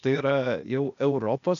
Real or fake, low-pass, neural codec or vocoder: fake; 7.2 kHz; codec, 16 kHz, 0.8 kbps, ZipCodec